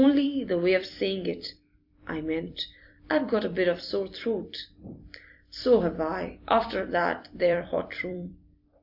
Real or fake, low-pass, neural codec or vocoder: fake; 5.4 kHz; vocoder, 44.1 kHz, 128 mel bands every 256 samples, BigVGAN v2